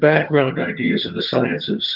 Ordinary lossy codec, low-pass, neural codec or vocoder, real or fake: Opus, 32 kbps; 5.4 kHz; vocoder, 22.05 kHz, 80 mel bands, HiFi-GAN; fake